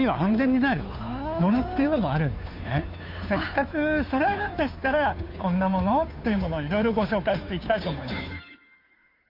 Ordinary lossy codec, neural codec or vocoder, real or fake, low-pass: none; codec, 16 kHz, 2 kbps, FunCodec, trained on Chinese and English, 25 frames a second; fake; 5.4 kHz